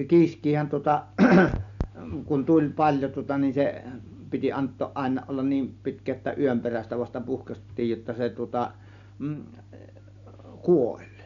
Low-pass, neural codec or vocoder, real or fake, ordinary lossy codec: 7.2 kHz; none; real; none